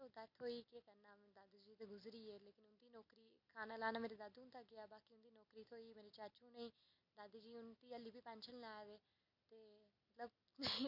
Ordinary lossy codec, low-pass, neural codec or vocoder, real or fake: none; 5.4 kHz; none; real